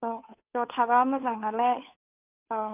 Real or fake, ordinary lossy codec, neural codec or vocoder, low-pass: fake; none; codec, 16 kHz, 2 kbps, FunCodec, trained on Chinese and English, 25 frames a second; 3.6 kHz